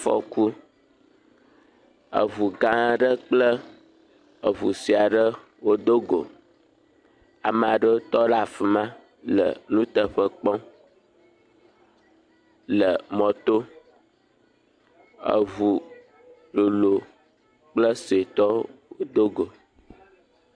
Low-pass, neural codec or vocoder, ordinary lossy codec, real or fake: 9.9 kHz; none; AAC, 64 kbps; real